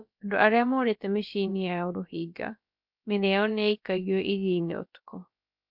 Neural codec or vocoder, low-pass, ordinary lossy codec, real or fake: codec, 16 kHz, about 1 kbps, DyCAST, with the encoder's durations; 5.4 kHz; MP3, 48 kbps; fake